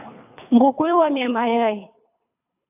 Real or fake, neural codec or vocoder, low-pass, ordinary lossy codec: fake; codec, 24 kHz, 3 kbps, HILCodec; 3.6 kHz; AAC, 32 kbps